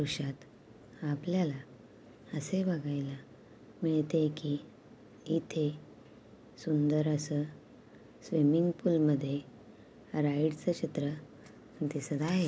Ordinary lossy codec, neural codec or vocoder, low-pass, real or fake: none; none; none; real